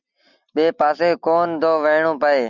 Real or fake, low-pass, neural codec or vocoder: real; 7.2 kHz; none